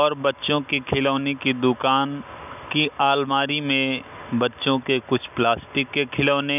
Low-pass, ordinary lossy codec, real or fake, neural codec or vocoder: 3.6 kHz; none; real; none